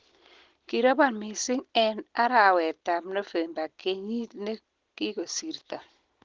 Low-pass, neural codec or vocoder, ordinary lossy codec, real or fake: 7.2 kHz; none; Opus, 16 kbps; real